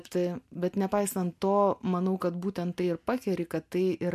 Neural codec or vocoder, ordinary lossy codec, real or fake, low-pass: none; MP3, 64 kbps; real; 14.4 kHz